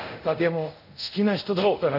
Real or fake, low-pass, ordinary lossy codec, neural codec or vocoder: fake; 5.4 kHz; none; codec, 24 kHz, 0.5 kbps, DualCodec